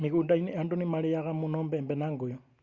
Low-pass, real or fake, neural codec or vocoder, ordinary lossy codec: 7.2 kHz; real; none; Opus, 64 kbps